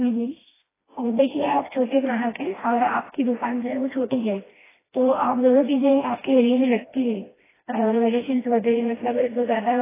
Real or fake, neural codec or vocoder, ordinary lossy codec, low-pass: fake; codec, 16 kHz, 1 kbps, FreqCodec, smaller model; AAC, 16 kbps; 3.6 kHz